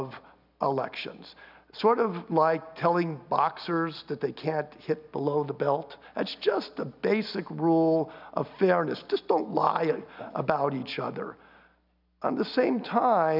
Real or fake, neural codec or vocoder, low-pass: real; none; 5.4 kHz